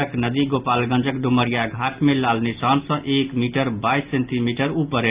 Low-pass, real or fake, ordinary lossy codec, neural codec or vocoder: 3.6 kHz; real; Opus, 24 kbps; none